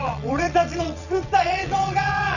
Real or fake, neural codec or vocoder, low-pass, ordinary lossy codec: fake; vocoder, 22.05 kHz, 80 mel bands, WaveNeXt; 7.2 kHz; none